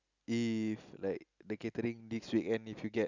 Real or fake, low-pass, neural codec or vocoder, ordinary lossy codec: real; 7.2 kHz; none; none